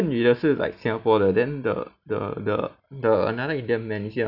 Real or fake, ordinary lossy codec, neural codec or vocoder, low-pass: real; none; none; 5.4 kHz